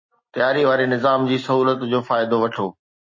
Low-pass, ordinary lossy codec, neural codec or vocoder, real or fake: 7.2 kHz; MP3, 32 kbps; none; real